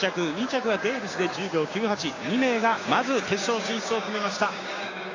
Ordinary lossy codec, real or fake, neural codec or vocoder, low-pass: AAC, 32 kbps; fake; codec, 16 kHz, 6 kbps, DAC; 7.2 kHz